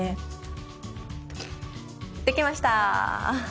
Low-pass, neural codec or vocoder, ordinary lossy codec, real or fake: none; none; none; real